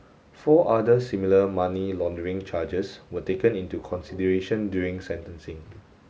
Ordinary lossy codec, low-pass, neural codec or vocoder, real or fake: none; none; none; real